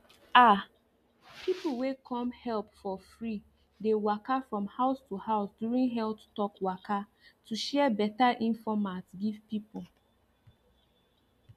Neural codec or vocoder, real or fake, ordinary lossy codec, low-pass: none; real; MP3, 96 kbps; 14.4 kHz